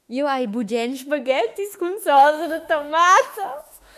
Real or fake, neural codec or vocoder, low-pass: fake; autoencoder, 48 kHz, 32 numbers a frame, DAC-VAE, trained on Japanese speech; 14.4 kHz